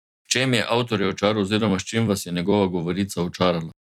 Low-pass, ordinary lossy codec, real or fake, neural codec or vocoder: 19.8 kHz; none; fake; vocoder, 44.1 kHz, 128 mel bands every 256 samples, BigVGAN v2